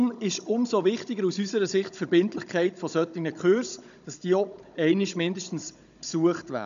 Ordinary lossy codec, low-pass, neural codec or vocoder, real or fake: none; 7.2 kHz; codec, 16 kHz, 16 kbps, FunCodec, trained on Chinese and English, 50 frames a second; fake